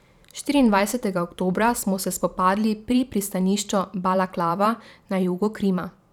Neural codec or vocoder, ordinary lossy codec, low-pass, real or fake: vocoder, 44.1 kHz, 128 mel bands every 512 samples, BigVGAN v2; none; 19.8 kHz; fake